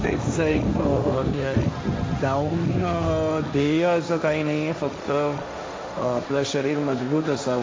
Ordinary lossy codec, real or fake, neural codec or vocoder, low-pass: none; fake; codec, 16 kHz, 1.1 kbps, Voila-Tokenizer; none